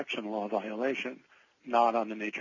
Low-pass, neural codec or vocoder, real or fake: 7.2 kHz; none; real